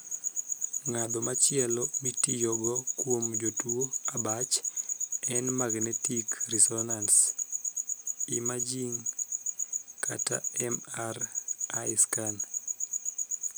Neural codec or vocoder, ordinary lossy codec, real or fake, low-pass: none; none; real; none